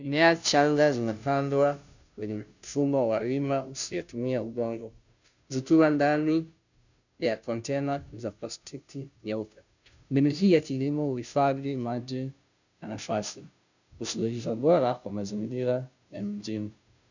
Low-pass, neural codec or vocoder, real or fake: 7.2 kHz; codec, 16 kHz, 0.5 kbps, FunCodec, trained on Chinese and English, 25 frames a second; fake